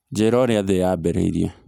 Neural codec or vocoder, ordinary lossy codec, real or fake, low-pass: none; none; real; 19.8 kHz